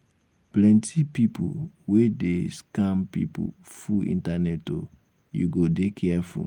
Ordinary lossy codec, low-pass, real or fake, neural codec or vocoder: Opus, 32 kbps; 19.8 kHz; real; none